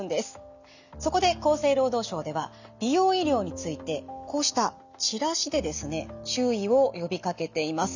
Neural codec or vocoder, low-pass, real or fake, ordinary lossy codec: none; 7.2 kHz; real; none